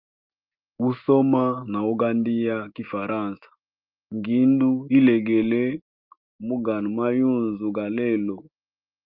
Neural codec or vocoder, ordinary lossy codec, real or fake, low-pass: none; Opus, 32 kbps; real; 5.4 kHz